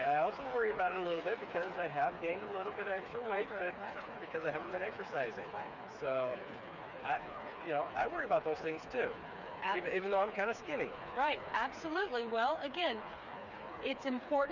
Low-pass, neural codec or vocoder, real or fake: 7.2 kHz; codec, 16 kHz, 4 kbps, FreqCodec, smaller model; fake